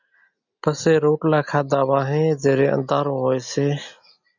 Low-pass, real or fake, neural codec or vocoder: 7.2 kHz; real; none